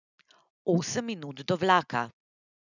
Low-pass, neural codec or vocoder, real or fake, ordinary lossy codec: 7.2 kHz; none; real; none